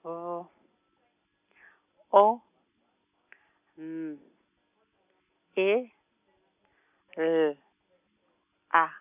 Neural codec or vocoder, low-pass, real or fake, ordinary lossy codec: none; 3.6 kHz; real; none